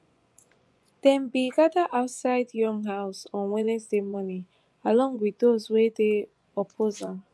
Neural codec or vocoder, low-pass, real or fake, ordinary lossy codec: vocoder, 24 kHz, 100 mel bands, Vocos; none; fake; none